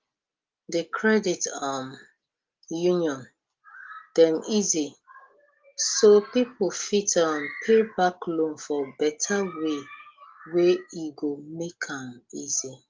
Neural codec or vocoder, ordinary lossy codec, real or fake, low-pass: none; Opus, 24 kbps; real; 7.2 kHz